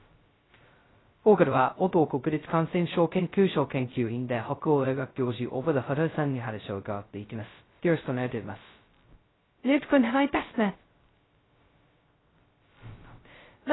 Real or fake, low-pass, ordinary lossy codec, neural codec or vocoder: fake; 7.2 kHz; AAC, 16 kbps; codec, 16 kHz, 0.2 kbps, FocalCodec